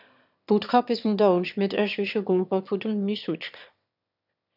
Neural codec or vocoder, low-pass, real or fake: autoencoder, 22.05 kHz, a latent of 192 numbers a frame, VITS, trained on one speaker; 5.4 kHz; fake